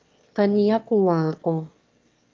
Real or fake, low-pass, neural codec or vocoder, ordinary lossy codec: fake; 7.2 kHz; autoencoder, 22.05 kHz, a latent of 192 numbers a frame, VITS, trained on one speaker; Opus, 32 kbps